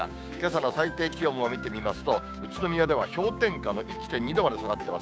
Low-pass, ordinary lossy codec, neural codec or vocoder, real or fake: none; none; codec, 16 kHz, 6 kbps, DAC; fake